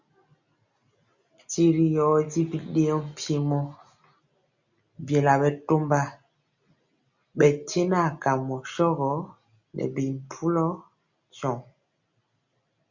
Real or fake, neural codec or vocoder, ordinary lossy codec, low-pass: real; none; Opus, 64 kbps; 7.2 kHz